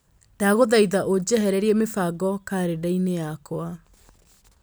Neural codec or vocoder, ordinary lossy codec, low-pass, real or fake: none; none; none; real